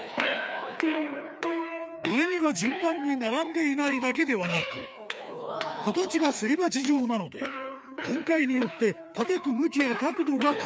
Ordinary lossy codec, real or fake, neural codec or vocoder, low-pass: none; fake; codec, 16 kHz, 2 kbps, FreqCodec, larger model; none